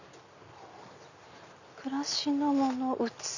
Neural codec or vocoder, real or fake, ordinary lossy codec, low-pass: none; real; none; 7.2 kHz